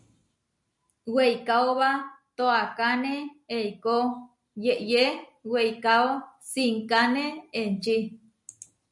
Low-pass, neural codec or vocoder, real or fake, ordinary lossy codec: 10.8 kHz; none; real; MP3, 96 kbps